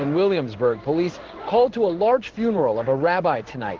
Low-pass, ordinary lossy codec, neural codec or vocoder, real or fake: 7.2 kHz; Opus, 16 kbps; codec, 16 kHz in and 24 kHz out, 1 kbps, XY-Tokenizer; fake